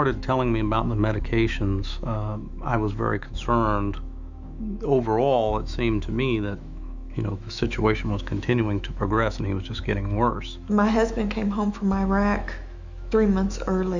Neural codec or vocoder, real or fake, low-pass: autoencoder, 48 kHz, 128 numbers a frame, DAC-VAE, trained on Japanese speech; fake; 7.2 kHz